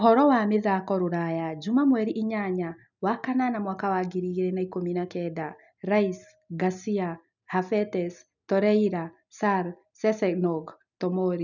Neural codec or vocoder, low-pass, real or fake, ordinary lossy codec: none; 7.2 kHz; real; none